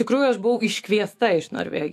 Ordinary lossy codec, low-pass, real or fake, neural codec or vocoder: AAC, 64 kbps; 14.4 kHz; fake; autoencoder, 48 kHz, 128 numbers a frame, DAC-VAE, trained on Japanese speech